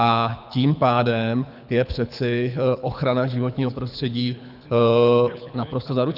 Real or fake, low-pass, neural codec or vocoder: fake; 5.4 kHz; codec, 24 kHz, 6 kbps, HILCodec